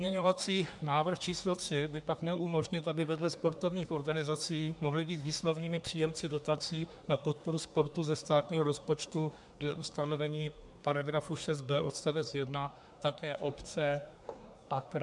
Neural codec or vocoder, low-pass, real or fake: codec, 24 kHz, 1 kbps, SNAC; 10.8 kHz; fake